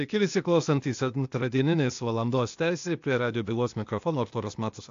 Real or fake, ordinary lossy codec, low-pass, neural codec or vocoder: fake; AAC, 64 kbps; 7.2 kHz; codec, 16 kHz, 0.8 kbps, ZipCodec